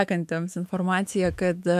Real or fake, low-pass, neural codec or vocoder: fake; 14.4 kHz; autoencoder, 48 kHz, 128 numbers a frame, DAC-VAE, trained on Japanese speech